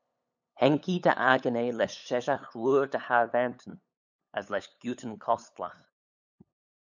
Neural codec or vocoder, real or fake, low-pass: codec, 16 kHz, 8 kbps, FunCodec, trained on LibriTTS, 25 frames a second; fake; 7.2 kHz